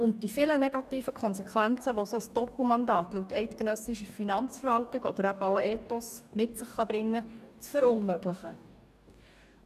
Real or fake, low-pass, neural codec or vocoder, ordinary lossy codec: fake; 14.4 kHz; codec, 44.1 kHz, 2.6 kbps, DAC; none